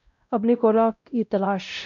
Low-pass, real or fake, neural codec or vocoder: 7.2 kHz; fake; codec, 16 kHz, 0.5 kbps, X-Codec, WavLM features, trained on Multilingual LibriSpeech